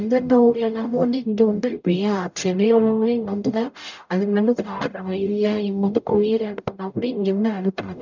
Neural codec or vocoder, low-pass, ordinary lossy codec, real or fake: codec, 44.1 kHz, 0.9 kbps, DAC; 7.2 kHz; none; fake